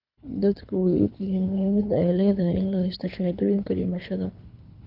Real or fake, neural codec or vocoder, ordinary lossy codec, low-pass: fake; codec, 24 kHz, 3 kbps, HILCodec; none; 5.4 kHz